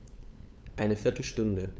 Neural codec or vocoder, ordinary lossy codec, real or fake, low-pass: codec, 16 kHz, 8 kbps, FunCodec, trained on LibriTTS, 25 frames a second; none; fake; none